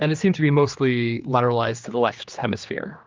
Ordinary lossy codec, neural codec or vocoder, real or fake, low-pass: Opus, 24 kbps; codec, 16 kHz, 2 kbps, X-Codec, HuBERT features, trained on general audio; fake; 7.2 kHz